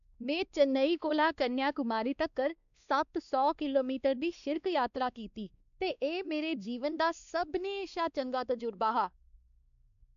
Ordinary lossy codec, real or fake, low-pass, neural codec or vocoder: none; fake; 7.2 kHz; codec, 16 kHz, 2 kbps, X-Codec, WavLM features, trained on Multilingual LibriSpeech